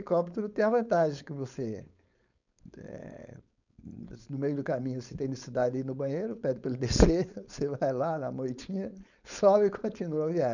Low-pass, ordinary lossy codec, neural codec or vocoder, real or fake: 7.2 kHz; none; codec, 16 kHz, 4.8 kbps, FACodec; fake